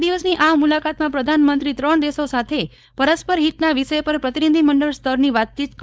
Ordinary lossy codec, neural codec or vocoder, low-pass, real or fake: none; codec, 16 kHz, 4.8 kbps, FACodec; none; fake